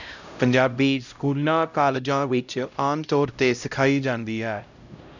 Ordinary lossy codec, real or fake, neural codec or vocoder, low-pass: none; fake; codec, 16 kHz, 0.5 kbps, X-Codec, HuBERT features, trained on LibriSpeech; 7.2 kHz